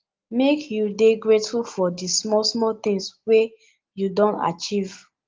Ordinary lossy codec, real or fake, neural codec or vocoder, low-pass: Opus, 24 kbps; real; none; 7.2 kHz